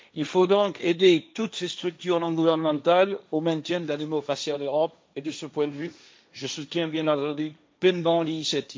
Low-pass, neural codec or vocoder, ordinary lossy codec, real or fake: none; codec, 16 kHz, 1.1 kbps, Voila-Tokenizer; none; fake